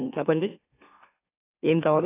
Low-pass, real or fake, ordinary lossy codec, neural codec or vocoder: 3.6 kHz; fake; AAC, 16 kbps; autoencoder, 44.1 kHz, a latent of 192 numbers a frame, MeloTTS